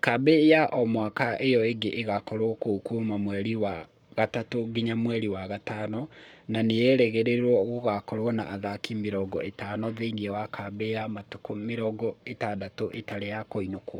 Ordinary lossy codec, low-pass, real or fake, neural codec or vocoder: none; 19.8 kHz; fake; codec, 44.1 kHz, 7.8 kbps, Pupu-Codec